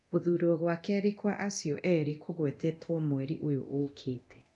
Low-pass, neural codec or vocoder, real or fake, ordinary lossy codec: 10.8 kHz; codec, 24 kHz, 0.9 kbps, DualCodec; fake; none